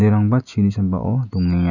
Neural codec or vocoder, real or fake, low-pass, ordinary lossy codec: none; real; 7.2 kHz; none